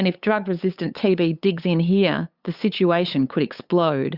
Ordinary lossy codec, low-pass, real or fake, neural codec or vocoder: Opus, 64 kbps; 5.4 kHz; fake; codec, 16 kHz, 8 kbps, FunCodec, trained on LibriTTS, 25 frames a second